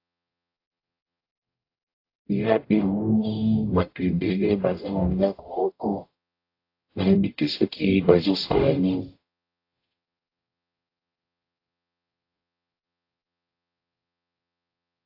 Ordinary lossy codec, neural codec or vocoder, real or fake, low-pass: AAC, 32 kbps; codec, 44.1 kHz, 0.9 kbps, DAC; fake; 5.4 kHz